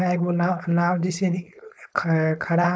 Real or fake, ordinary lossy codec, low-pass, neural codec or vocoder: fake; none; none; codec, 16 kHz, 4.8 kbps, FACodec